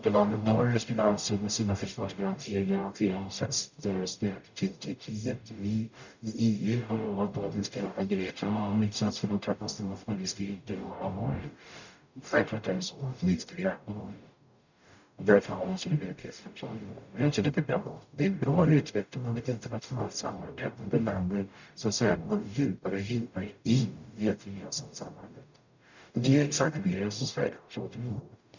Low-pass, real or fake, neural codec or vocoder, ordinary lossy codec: 7.2 kHz; fake; codec, 44.1 kHz, 0.9 kbps, DAC; none